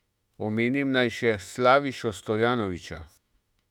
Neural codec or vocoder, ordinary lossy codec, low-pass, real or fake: autoencoder, 48 kHz, 32 numbers a frame, DAC-VAE, trained on Japanese speech; none; 19.8 kHz; fake